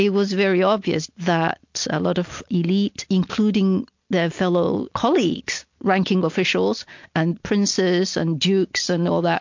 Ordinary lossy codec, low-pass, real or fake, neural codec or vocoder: MP3, 48 kbps; 7.2 kHz; real; none